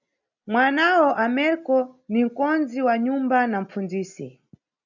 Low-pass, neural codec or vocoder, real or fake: 7.2 kHz; none; real